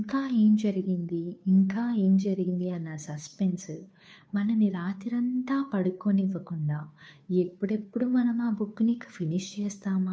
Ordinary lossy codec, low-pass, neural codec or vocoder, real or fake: none; none; codec, 16 kHz, 2 kbps, FunCodec, trained on Chinese and English, 25 frames a second; fake